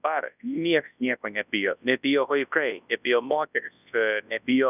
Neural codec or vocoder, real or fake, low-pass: codec, 24 kHz, 0.9 kbps, WavTokenizer, large speech release; fake; 3.6 kHz